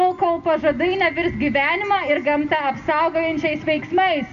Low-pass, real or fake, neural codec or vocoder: 7.2 kHz; real; none